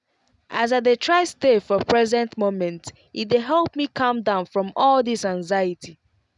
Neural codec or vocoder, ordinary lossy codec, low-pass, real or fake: none; none; 10.8 kHz; real